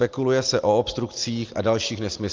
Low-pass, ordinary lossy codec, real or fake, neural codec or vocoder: 7.2 kHz; Opus, 32 kbps; real; none